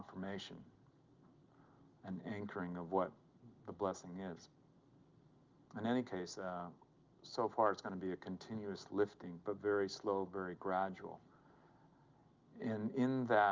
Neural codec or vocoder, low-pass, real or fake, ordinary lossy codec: none; 7.2 kHz; real; Opus, 32 kbps